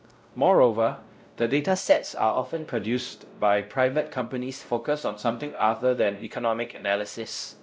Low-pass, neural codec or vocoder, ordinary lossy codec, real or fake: none; codec, 16 kHz, 0.5 kbps, X-Codec, WavLM features, trained on Multilingual LibriSpeech; none; fake